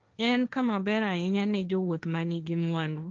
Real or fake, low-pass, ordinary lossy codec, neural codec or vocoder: fake; 7.2 kHz; Opus, 24 kbps; codec, 16 kHz, 1.1 kbps, Voila-Tokenizer